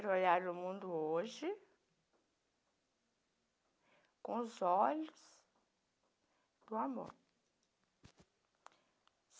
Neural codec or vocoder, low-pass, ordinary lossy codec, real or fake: none; none; none; real